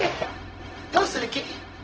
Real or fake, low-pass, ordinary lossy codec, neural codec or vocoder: fake; 7.2 kHz; Opus, 16 kbps; codec, 16 kHz, 0.4 kbps, LongCat-Audio-Codec